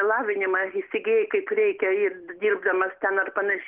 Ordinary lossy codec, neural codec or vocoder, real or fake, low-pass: Opus, 16 kbps; none; real; 3.6 kHz